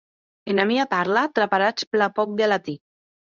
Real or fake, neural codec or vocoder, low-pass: fake; codec, 24 kHz, 0.9 kbps, WavTokenizer, medium speech release version 2; 7.2 kHz